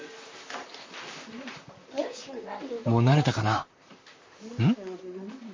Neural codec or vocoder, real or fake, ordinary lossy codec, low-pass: vocoder, 44.1 kHz, 128 mel bands, Pupu-Vocoder; fake; MP3, 32 kbps; 7.2 kHz